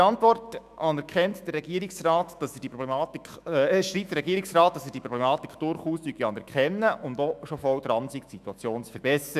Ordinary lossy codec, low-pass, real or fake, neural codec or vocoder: none; 14.4 kHz; fake; autoencoder, 48 kHz, 128 numbers a frame, DAC-VAE, trained on Japanese speech